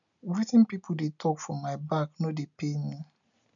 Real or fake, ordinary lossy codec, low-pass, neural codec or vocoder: real; none; 7.2 kHz; none